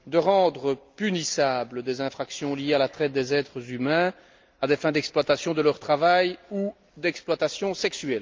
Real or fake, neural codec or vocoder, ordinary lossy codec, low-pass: real; none; Opus, 24 kbps; 7.2 kHz